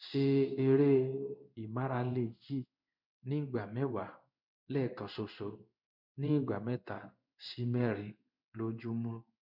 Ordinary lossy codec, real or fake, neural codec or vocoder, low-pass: none; fake; codec, 16 kHz in and 24 kHz out, 1 kbps, XY-Tokenizer; 5.4 kHz